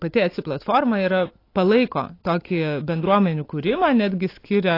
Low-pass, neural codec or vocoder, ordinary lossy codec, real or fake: 5.4 kHz; none; AAC, 32 kbps; real